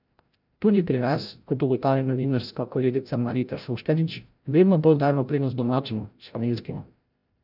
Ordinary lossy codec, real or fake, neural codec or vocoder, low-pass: none; fake; codec, 16 kHz, 0.5 kbps, FreqCodec, larger model; 5.4 kHz